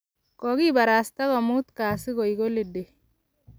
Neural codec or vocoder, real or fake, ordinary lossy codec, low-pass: none; real; none; none